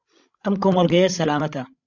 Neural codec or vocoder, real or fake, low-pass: codec, 16 kHz, 16 kbps, FreqCodec, larger model; fake; 7.2 kHz